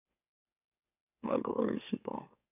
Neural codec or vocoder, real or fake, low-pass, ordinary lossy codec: autoencoder, 44.1 kHz, a latent of 192 numbers a frame, MeloTTS; fake; 3.6 kHz; none